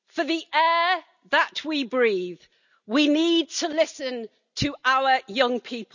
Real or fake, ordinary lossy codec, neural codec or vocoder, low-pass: real; none; none; 7.2 kHz